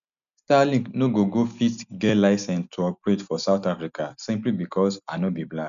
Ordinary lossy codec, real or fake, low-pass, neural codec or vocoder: none; real; 7.2 kHz; none